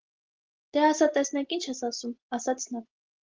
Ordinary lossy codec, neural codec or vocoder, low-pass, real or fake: Opus, 16 kbps; none; 7.2 kHz; real